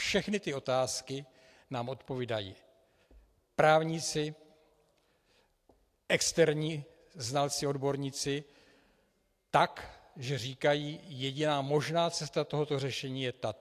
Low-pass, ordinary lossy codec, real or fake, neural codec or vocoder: 14.4 kHz; AAC, 64 kbps; real; none